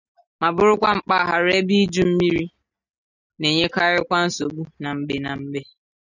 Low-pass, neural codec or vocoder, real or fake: 7.2 kHz; none; real